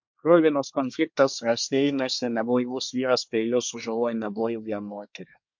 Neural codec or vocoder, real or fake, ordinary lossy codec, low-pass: codec, 24 kHz, 1 kbps, SNAC; fake; MP3, 48 kbps; 7.2 kHz